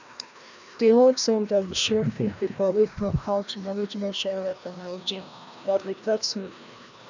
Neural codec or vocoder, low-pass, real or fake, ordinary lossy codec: codec, 16 kHz, 1 kbps, FreqCodec, larger model; 7.2 kHz; fake; none